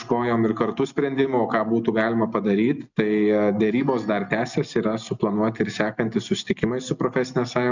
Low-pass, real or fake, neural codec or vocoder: 7.2 kHz; real; none